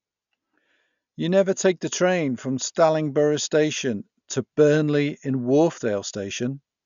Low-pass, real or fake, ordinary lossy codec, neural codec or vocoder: 7.2 kHz; real; none; none